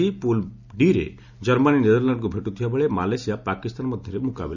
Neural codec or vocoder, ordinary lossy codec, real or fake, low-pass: none; none; real; 7.2 kHz